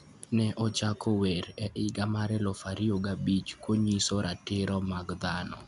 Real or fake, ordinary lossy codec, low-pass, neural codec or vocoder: real; none; 10.8 kHz; none